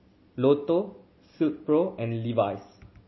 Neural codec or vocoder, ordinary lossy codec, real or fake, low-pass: none; MP3, 24 kbps; real; 7.2 kHz